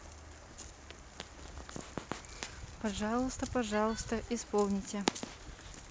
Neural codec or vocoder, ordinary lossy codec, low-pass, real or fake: none; none; none; real